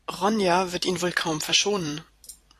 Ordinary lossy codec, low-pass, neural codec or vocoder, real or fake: AAC, 64 kbps; 14.4 kHz; vocoder, 44.1 kHz, 128 mel bands every 256 samples, BigVGAN v2; fake